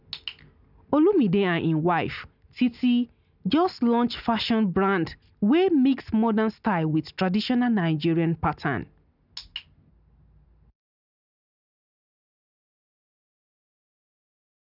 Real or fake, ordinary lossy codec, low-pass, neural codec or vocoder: real; none; 5.4 kHz; none